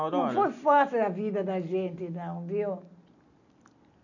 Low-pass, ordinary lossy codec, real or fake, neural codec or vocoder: 7.2 kHz; none; real; none